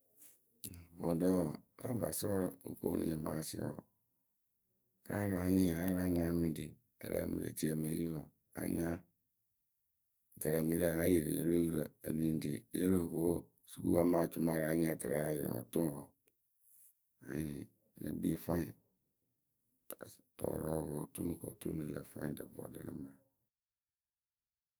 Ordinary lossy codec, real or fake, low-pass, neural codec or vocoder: none; fake; none; codec, 44.1 kHz, 2.6 kbps, SNAC